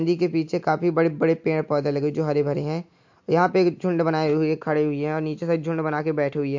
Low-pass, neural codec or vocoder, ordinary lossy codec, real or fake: 7.2 kHz; none; MP3, 48 kbps; real